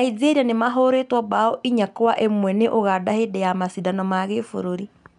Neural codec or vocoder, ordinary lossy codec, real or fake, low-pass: none; MP3, 96 kbps; real; 10.8 kHz